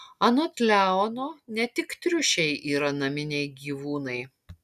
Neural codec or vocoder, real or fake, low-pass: none; real; 14.4 kHz